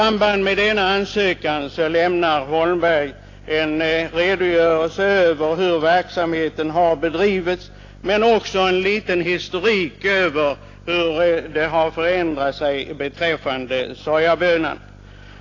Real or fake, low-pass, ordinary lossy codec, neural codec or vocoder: real; 7.2 kHz; AAC, 32 kbps; none